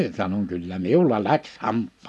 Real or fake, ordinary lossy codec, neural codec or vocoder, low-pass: real; none; none; none